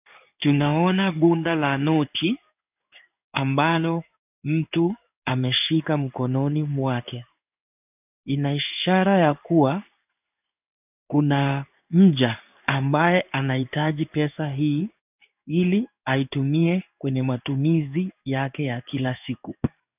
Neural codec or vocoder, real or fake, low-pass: codec, 16 kHz in and 24 kHz out, 1 kbps, XY-Tokenizer; fake; 3.6 kHz